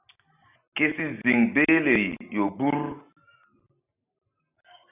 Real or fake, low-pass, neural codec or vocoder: real; 3.6 kHz; none